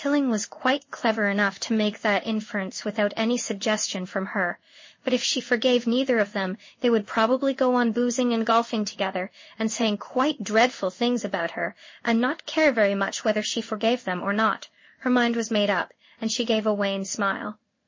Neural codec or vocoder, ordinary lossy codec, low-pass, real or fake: codec, 16 kHz in and 24 kHz out, 1 kbps, XY-Tokenizer; MP3, 32 kbps; 7.2 kHz; fake